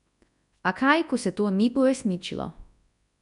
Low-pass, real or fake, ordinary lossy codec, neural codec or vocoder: 10.8 kHz; fake; none; codec, 24 kHz, 0.9 kbps, WavTokenizer, large speech release